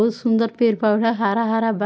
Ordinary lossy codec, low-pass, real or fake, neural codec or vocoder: none; none; real; none